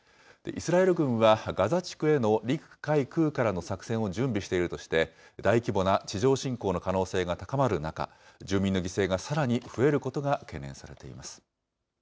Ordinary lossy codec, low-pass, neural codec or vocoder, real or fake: none; none; none; real